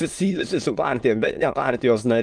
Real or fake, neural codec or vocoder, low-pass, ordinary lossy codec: fake; autoencoder, 22.05 kHz, a latent of 192 numbers a frame, VITS, trained on many speakers; 9.9 kHz; Opus, 32 kbps